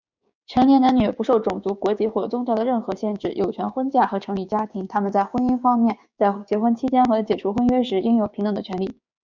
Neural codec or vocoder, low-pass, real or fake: codec, 16 kHz, 6 kbps, DAC; 7.2 kHz; fake